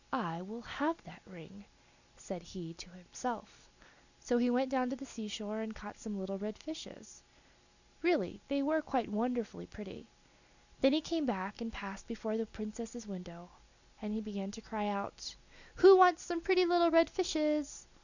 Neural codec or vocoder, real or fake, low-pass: none; real; 7.2 kHz